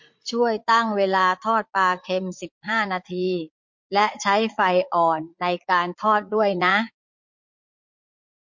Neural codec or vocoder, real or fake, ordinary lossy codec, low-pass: vocoder, 44.1 kHz, 80 mel bands, Vocos; fake; MP3, 48 kbps; 7.2 kHz